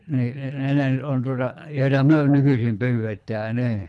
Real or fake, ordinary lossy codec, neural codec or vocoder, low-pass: fake; none; codec, 24 kHz, 3 kbps, HILCodec; none